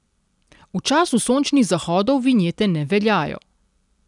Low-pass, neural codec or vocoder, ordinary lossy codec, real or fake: 10.8 kHz; none; none; real